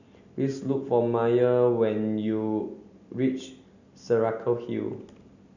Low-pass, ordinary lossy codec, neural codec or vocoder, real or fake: 7.2 kHz; none; none; real